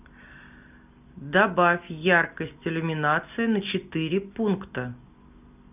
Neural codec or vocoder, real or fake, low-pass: none; real; 3.6 kHz